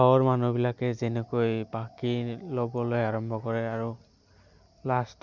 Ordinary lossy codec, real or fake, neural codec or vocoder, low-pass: none; real; none; 7.2 kHz